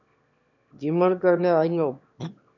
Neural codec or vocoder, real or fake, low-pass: autoencoder, 22.05 kHz, a latent of 192 numbers a frame, VITS, trained on one speaker; fake; 7.2 kHz